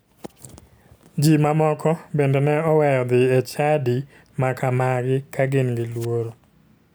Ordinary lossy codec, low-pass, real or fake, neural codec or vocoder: none; none; real; none